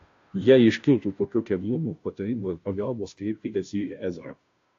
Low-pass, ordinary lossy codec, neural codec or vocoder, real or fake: 7.2 kHz; AAC, 48 kbps; codec, 16 kHz, 0.5 kbps, FunCodec, trained on Chinese and English, 25 frames a second; fake